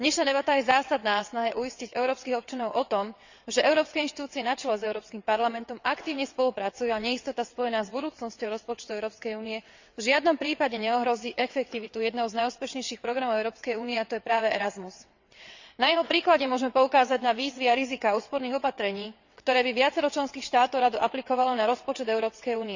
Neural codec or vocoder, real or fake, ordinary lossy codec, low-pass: vocoder, 22.05 kHz, 80 mel bands, WaveNeXt; fake; Opus, 64 kbps; 7.2 kHz